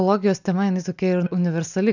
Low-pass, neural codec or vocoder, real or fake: 7.2 kHz; none; real